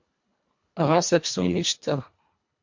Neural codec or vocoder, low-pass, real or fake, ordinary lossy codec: codec, 24 kHz, 1.5 kbps, HILCodec; 7.2 kHz; fake; MP3, 48 kbps